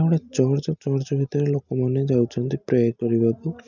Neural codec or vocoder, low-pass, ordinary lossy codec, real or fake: none; 7.2 kHz; none; real